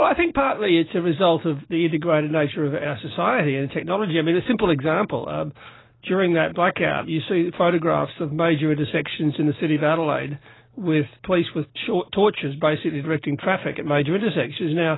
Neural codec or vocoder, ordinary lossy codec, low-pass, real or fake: none; AAC, 16 kbps; 7.2 kHz; real